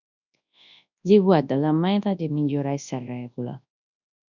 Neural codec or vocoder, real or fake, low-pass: codec, 24 kHz, 0.9 kbps, WavTokenizer, large speech release; fake; 7.2 kHz